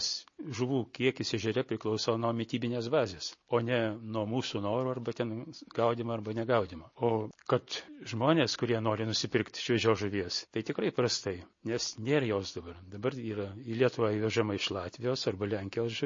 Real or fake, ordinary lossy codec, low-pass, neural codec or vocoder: real; MP3, 32 kbps; 7.2 kHz; none